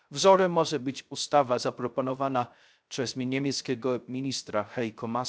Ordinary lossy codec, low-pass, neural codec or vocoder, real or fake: none; none; codec, 16 kHz, 0.3 kbps, FocalCodec; fake